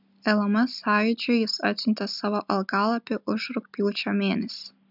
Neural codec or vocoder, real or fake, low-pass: none; real; 5.4 kHz